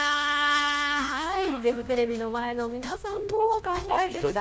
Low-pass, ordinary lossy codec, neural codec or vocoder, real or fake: none; none; codec, 16 kHz, 1 kbps, FunCodec, trained on LibriTTS, 50 frames a second; fake